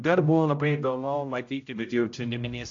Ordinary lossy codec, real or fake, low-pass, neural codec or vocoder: AAC, 48 kbps; fake; 7.2 kHz; codec, 16 kHz, 0.5 kbps, X-Codec, HuBERT features, trained on general audio